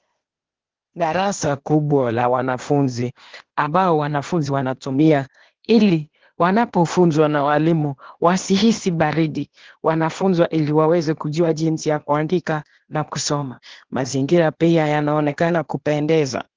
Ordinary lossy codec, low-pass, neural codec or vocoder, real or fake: Opus, 16 kbps; 7.2 kHz; codec, 16 kHz, 0.8 kbps, ZipCodec; fake